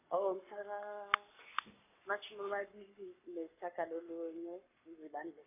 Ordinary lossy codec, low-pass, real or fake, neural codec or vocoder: none; 3.6 kHz; fake; codec, 44.1 kHz, 7.8 kbps, Pupu-Codec